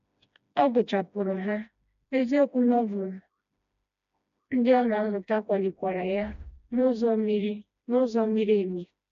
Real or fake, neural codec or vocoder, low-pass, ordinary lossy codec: fake; codec, 16 kHz, 1 kbps, FreqCodec, smaller model; 7.2 kHz; AAC, 96 kbps